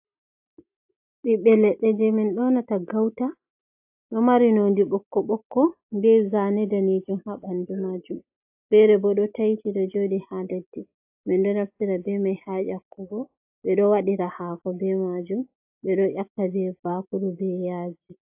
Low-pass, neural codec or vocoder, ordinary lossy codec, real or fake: 3.6 kHz; none; AAC, 32 kbps; real